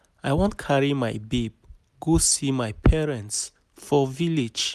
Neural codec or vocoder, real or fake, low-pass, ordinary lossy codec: none; real; 14.4 kHz; none